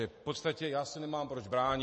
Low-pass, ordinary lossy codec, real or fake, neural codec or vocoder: 10.8 kHz; MP3, 32 kbps; real; none